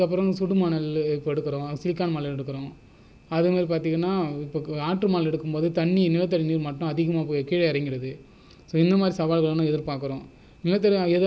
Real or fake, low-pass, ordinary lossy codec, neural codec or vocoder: real; none; none; none